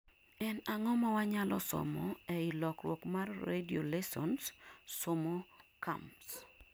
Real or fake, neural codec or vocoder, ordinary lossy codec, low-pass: real; none; none; none